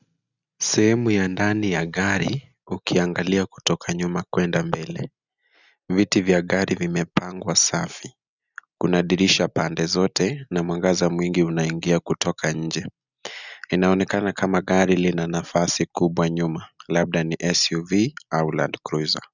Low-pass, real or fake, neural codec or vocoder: 7.2 kHz; real; none